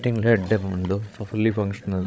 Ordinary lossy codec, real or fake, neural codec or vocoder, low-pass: none; fake; codec, 16 kHz, 16 kbps, FreqCodec, larger model; none